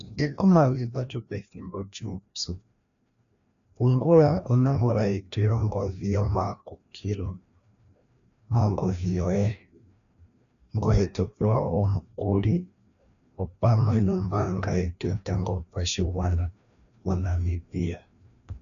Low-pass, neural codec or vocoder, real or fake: 7.2 kHz; codec, 16 kHz, 1 kbps, FreqCodec, larger model; fake